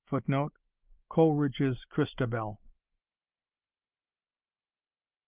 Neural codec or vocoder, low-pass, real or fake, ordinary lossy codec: none; 3.6 kHz; real; Opus, 24 kbps